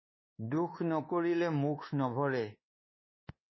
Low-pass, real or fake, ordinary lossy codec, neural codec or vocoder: 7.2 kHz; fake; MP3, 24 kbps; codec, 24 kHz, 1.2 kbps, DualCodec